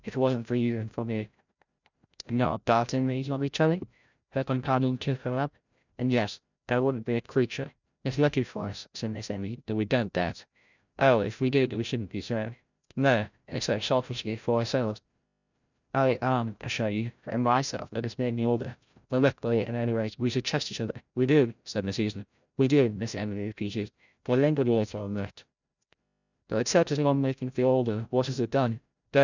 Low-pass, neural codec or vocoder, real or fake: 7.2 kHz; codec, 16 kHz, 0.5 kbps, FreqCodec, larger model; fake